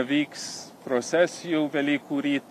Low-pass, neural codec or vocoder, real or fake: 14.4 kHz; none; real